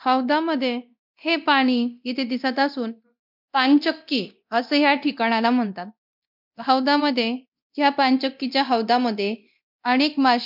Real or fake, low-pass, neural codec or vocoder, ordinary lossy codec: fake; 5.4 kHz; codec, 24 kHz, 0.9 kbps, DualCodec; MP3, 48 kbps